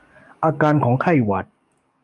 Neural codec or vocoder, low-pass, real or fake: codec, 44.1 kHz, 7.8 kbps, DAC; 10.8 kHz; fake